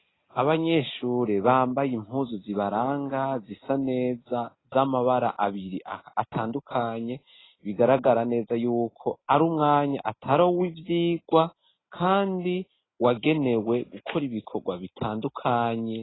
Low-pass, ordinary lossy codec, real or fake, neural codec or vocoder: 7.2 kHz; AAC, 16 kbps; real; none